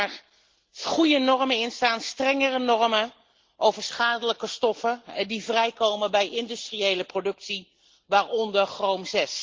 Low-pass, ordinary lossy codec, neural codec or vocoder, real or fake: 7.2 kHz; Opus, 16 kbps; none; real